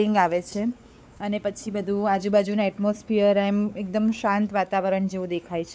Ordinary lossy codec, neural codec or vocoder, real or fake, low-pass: none; codec, 16 kHz, 4 kbps, X-Codec, WavLM features, trained on Multilingual LibriSpeech; fake; none